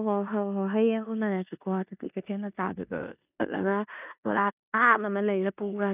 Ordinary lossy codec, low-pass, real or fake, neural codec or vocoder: none; 3.6 kHz; fake; codec, 16 kHz in and 24 kHz out, 0.9 kbps, LongCat-Audio-Codec, four codebook decoder